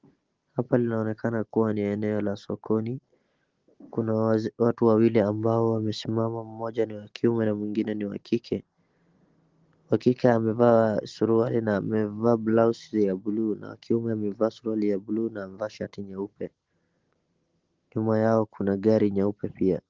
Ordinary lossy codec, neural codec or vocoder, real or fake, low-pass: Opus, 16 kbps; none; real; 7.2 kHz